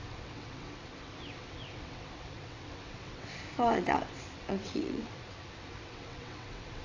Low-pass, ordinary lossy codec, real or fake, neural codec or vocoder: 7.2 kHz; AAC, 32 kbps; fake; vocoder, 22.05 kHz, 80 mel bands, WaveNeXt